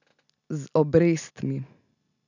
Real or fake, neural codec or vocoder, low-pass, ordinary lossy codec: real; none; 7.2 kHz; none